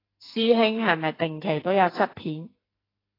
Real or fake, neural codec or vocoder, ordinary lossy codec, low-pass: fake; codec, 44.1 kHz, 2.6 kbps, SNAC; AAC, 24 kbps; 5.4 kHz